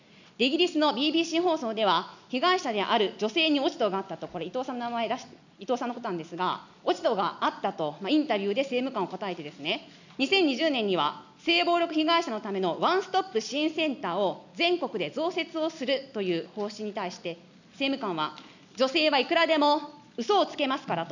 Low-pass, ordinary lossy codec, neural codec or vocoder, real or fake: 7.2 kHz; none; none; real